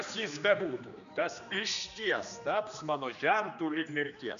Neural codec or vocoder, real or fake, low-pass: codec, 16 kHz, 2 kbps, X-Codec, HuBERT features, trained on general audio; fake; 7.2 kHz